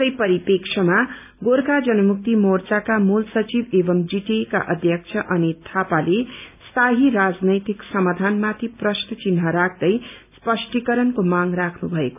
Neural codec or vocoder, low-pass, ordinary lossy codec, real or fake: none; 3.6 kHz; none; real